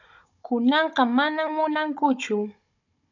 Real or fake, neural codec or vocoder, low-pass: fake; codec, 16 kHz, 16 kbps, FunCodec, trained on Chinese and English, 50 frames a second; 7.2 kHz